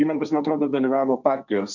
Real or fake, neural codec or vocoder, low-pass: fake; codec, 16 kHz, 1.1 kbps, Voila-Tokenizer; 7.2 kHz